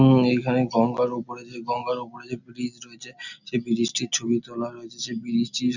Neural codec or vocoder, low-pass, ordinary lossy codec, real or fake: none; 7.2 kHz; none; real